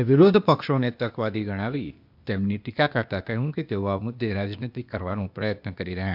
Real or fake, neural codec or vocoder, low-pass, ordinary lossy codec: fake; codec, 16 kHz, 0.8 kbps, ZipCodec; 5.4 kHz; none